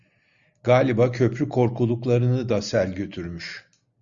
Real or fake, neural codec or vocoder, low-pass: real; none; 7.2 kHz